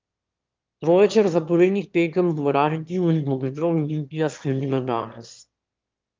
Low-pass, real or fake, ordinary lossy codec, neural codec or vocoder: 7.2 kHz; fake; Opus, 32 kbps; autoencoder, 22.05 kHz, a latent of 192 numbers a frame, VITS, trained on one speaker